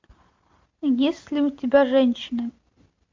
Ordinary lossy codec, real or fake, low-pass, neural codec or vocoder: MP3, 48 kbps; real; 7.2 kHz; none